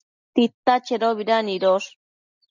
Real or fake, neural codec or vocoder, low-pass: real; none; 7.2 kHz